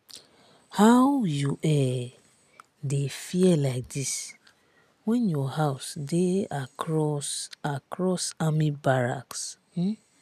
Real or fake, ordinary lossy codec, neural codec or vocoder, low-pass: real; none; none; 14.4 kHz